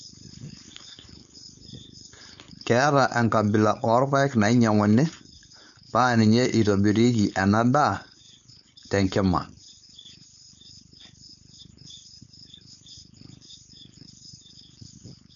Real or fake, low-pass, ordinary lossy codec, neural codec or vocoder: fake; 7.2 kHz; none; codec, 16 kHz, 4.8 kbps, FACodec